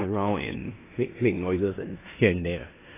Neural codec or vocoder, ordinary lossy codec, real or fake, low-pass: codec, 16 kHz in and 24 kHz out, 0.9 kbps, LongCat-Audio-Codec, four codebook decoder; AAC, 16 kbps; fake; 3.6 kHz